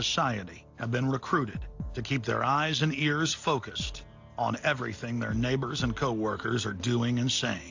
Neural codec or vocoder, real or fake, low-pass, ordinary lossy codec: none; real; 7.2 kHz; AAC, 48 kbps